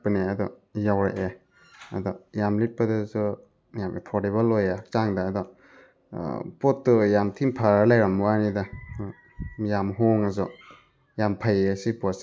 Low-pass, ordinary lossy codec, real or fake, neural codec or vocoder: none; none; real; none